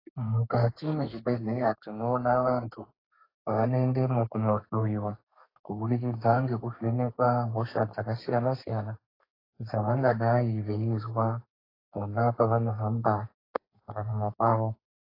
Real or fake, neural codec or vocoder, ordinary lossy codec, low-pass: fake; codec, 32 kHz, 1.9 kbps, SNAC; AAC, 24 kbps; 5.4 kHz